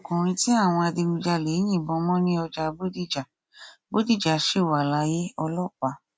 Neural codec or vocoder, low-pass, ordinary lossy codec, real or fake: none; none; none; real